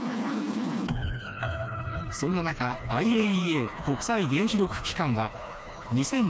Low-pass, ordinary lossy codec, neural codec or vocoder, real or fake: none; none; codec, 16 kHz, 2 kbps, FreqCodec, smaller model; fake